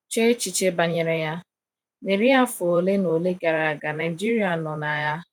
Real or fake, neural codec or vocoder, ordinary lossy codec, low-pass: fake; vocoder, 44.1 kHz, 128 mel bands every 512 samples, BigVGAN v2; none; 19.8 kHz